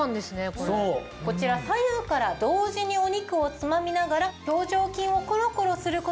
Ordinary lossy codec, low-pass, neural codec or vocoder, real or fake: none; none; none; real